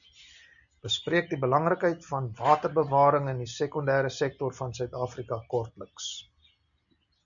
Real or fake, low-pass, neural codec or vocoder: real; 7.2 kHz; none